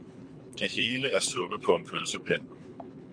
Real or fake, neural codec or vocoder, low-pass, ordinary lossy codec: fake; codec, 24 kHz, 3 kbps, HILCodec; 9.9 kHz; MP3, 64 kbps